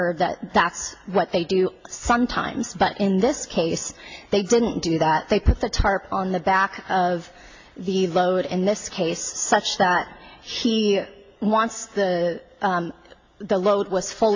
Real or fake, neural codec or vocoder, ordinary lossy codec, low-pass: real; none; AAC, 48 kbps; 7.2 kHz